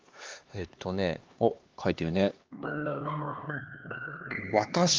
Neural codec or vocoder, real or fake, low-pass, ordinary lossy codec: codec, 16 kHz, 2 kbps, X-Codec, HuBERT features, trained on LibriSpeech; fake; 7.2 kHz; Opus, 32 kbps